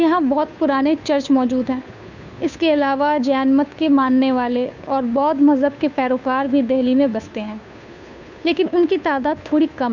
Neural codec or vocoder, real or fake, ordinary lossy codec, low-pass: codec, 16 kHz, 2 kbps, FunCodec, trained on Chinese and English, 25 frames a second; fake; none; 7.2 kHz